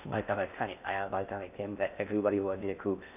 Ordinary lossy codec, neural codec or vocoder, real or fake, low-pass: none; codec, 16 kHz in and 24 kHz out, 0.6 kbps, FocalCodec, streaming, 4096 codes; fake; 3.6 kHz